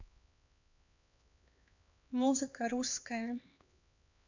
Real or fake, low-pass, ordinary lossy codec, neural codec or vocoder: fake; 7.2 kHz; none; codec, 16 kHz, 2 kbps, X-Codec, HuBERT features, trained on LibriSpeech